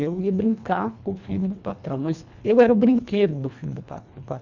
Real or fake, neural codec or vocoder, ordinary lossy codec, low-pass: fake; codec, 24 kHz, 1.5 kbps, HILCodec; none; 7.2 kHz